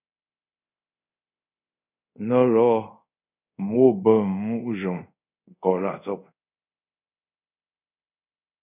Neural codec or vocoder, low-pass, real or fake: codec, 24 kHz, 0.5 kbps, DualCodec; 3.6 kHz; fake